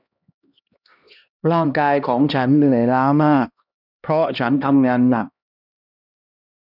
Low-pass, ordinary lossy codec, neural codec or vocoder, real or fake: 5.4 kHz; none; codec, 16 kHz, 1 kbps, X-Codec, HuBERT features, trained on LibriSpeech; fake